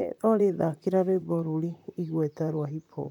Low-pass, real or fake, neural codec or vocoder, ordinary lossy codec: 19.8 kHz; fake; vocoder, 44.1 kHz, 128 mel bands, Pupu-Vocoder; none